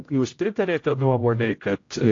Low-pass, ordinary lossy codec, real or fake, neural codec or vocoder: 7.2 kHz; AAC, 48 kbps; fake; codec, 16 kHz, 0.5 kbps, X-Codec, HuBERT features, trained on general audio